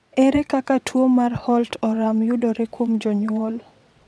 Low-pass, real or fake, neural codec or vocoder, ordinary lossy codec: none; fake; vocoder, 22.05 kHz, 80 mel bands, WaveNeXt; none